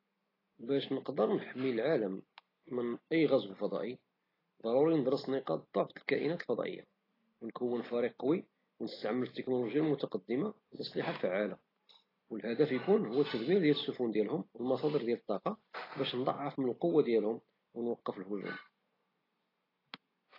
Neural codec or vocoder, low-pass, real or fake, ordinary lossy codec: none; 5.4 kHz; real; AAC, 24 kbps